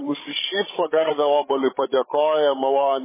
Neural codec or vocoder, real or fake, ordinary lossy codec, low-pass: codec, 16 kHz, 16 kbps, FreqCodec, larger model; fake; MP3, 16 kbps; 3.6 kHz